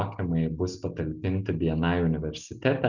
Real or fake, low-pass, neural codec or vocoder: real; 7.2 kHz; none